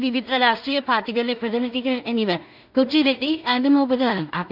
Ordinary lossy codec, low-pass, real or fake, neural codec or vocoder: none; 5.4 kHz; fake; codec, 16 kHz in and 24 kHz out, 0.4 kbps, LongCat-Audio-Codec, two codebook decoder